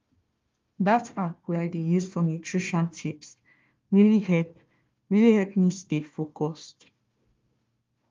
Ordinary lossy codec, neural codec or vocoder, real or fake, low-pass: Opus, 32 kbps; codec, 16 kHz, 1 kbps, FunCodec, trained on Chinese and English, 50 frames a second; fake; 7.2 kHz